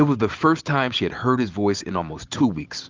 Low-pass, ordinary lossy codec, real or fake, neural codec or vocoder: 7.2 kHz; Opus, 32 kbps; real; none